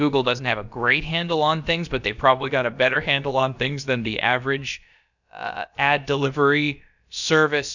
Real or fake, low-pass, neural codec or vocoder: fake; 7.2 kHz; codec, 16 kHz, about 1 kbps, DyCAST, with the encoder's durations